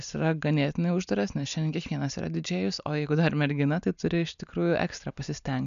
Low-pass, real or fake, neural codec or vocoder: 7.2 kHz; real; none